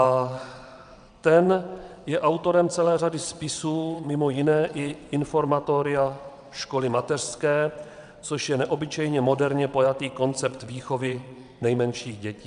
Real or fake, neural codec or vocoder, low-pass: fake; vocoder, 22.05 kHz, 80 mel bands, WaveNeXt; 9.9 kHz